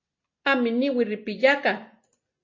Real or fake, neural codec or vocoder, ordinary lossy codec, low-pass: real; none; MP3, 32 kbps; 7.2 kHz